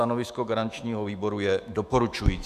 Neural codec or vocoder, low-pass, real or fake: autoencoder, 48 kHz, 128 numbers a frame, DAC-VAE, trained on Japanese speech; 14.4 kHz; fake